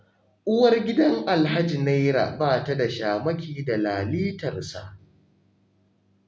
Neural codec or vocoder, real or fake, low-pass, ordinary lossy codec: none; real; none; none